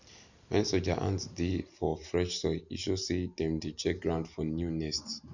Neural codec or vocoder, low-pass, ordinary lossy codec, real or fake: none; 7.2 kHz; none; real